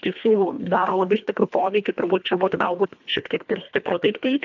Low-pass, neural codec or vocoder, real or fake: 7.2 kHz; codec, 24 kHz, 1.5 kbps, HILCodec; fake